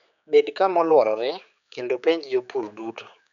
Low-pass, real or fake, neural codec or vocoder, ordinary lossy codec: 7.2 kHz; fake; codec, 16 kHz, 4 kbps, X-Codec, HuBERT features, trained on general audio; none